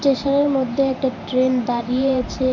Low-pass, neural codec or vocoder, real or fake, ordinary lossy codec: 7.2 kHz; vocoder, 44.1 kHz, 128 mel bands every 512 samples, BigVGAN v2; fake; none